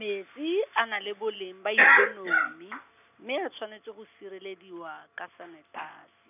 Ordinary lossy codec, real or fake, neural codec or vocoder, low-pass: none; real; none; 3.6 kHz